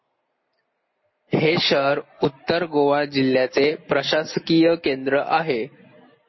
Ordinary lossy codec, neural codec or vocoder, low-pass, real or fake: MP3, 24 kbps; none; 7.2 kHz; real